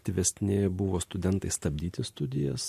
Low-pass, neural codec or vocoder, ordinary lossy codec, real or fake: 14.4 kHz; none; MP3, 64 kbps; real